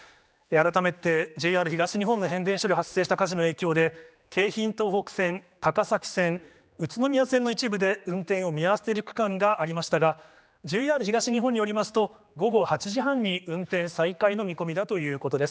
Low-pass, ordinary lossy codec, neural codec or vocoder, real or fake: none; none; codec, 16 kHz, 2 kbps, X-Codec, HuBERT features, trained on general audio; fake